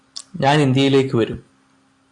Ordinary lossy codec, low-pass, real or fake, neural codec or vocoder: AAC, 64 kbps; 10.8 kHz; real; none